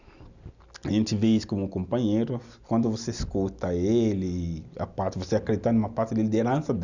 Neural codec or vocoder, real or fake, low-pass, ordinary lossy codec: none; real; 7.2 kHz; none